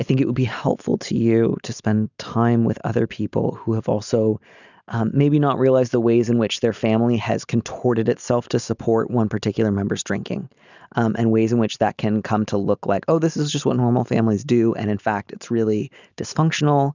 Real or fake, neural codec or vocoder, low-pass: real; none; 7.2 kHz